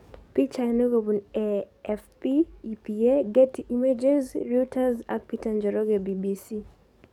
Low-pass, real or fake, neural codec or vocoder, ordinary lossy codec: 19.8 kHz; fake; codec, 44.1 kHz, 7.8 kbps, DAC; none